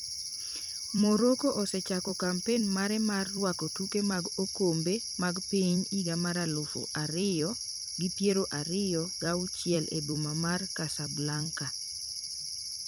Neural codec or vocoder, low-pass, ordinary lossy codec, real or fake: vocoder, 44.1 kHz, 128 mel bands every 256 samples, BigVGAN v2; none; none; fake